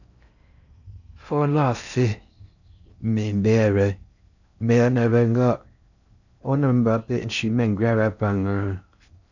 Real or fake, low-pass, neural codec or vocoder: fake; 7.2 kHz; codec, 16 kHz in and 24 kHz out, 0.6 kbps, FocalCodec, streaming, 4096 codes